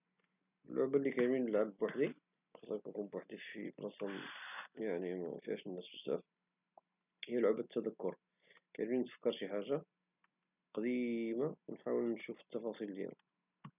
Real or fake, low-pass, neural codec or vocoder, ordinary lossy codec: real; 3.6 kHz; none; none